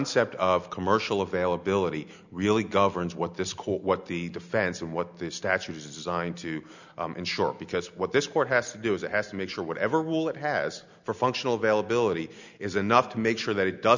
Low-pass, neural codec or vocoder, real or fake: 7.2 kHz; none; real